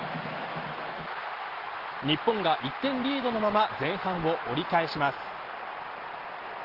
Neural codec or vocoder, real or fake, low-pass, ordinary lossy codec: none; real; 5.4 kHz; Opus, 16 kbps